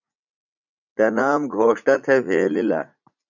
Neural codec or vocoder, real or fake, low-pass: vocoder, 44.1 kHz, 80 mel bands, Vocos; fake; 7.2 kHz